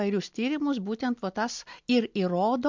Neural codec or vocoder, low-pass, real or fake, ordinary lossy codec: none; 7.2 kHz; real; MP3, 64 kbps